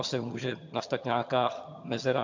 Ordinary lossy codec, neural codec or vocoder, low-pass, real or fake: MP3, 48 kbps; vocoder, 22.05 kHz, 80 mel bands, HiFi-GAN; 7.2 kHz; fake